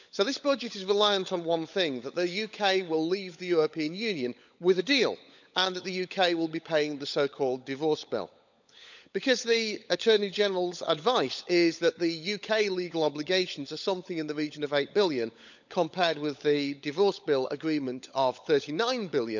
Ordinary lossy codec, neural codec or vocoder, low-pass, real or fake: none; codec, 16 kHz, 16 kbps, FunCodec, trained on LibriTTS, 50 frames a second; 7.2 kHz; fake